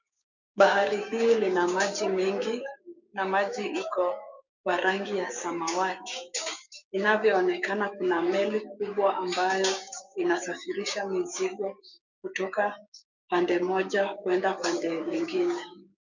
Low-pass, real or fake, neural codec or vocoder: 7.2 kHz; fake; codec, 16 kHz, 6 kbps, DAC